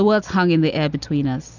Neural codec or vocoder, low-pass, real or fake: none; 7.2 kHz; real